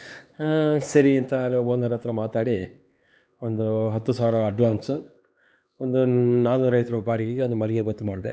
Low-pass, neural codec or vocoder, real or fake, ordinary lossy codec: none; codec, 16 kHz, 2 kbps, X-Codec, HuBERT features, trained on LibriSpeech; fake; none